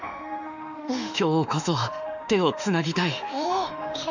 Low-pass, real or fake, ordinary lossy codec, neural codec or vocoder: 7.2 kHz; fake; none; autoencoder, 48 kHz, 32 numbers a frame, DAC-VAE, trained on Japanese speech